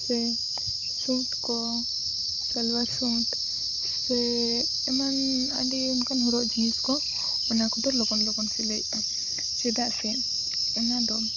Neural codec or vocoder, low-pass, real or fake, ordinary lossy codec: codec, 16 kHz, 16 kbps, FunCodec, trained on Chinese and English, 50 frames a second; 7.2 kHz; fake; none